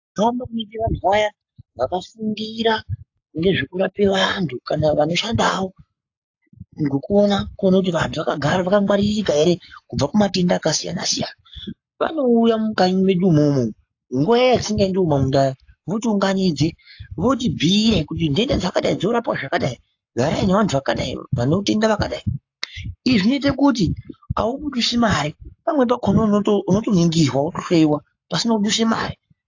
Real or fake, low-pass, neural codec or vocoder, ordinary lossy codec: fake; 7.2 kHz; codec, 44.1 kHz, 7.8 kbps, Pupu-Codec; AAC, 48 kbps